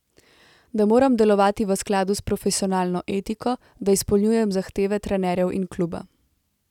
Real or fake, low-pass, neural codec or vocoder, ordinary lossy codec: real; 19.8 kHz; none; none